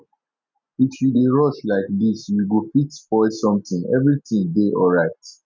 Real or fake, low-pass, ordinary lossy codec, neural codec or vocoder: real; none; none; none